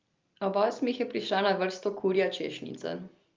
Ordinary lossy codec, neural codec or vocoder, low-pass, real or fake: Opus, 24 kbps; none; 7.2 kHz; real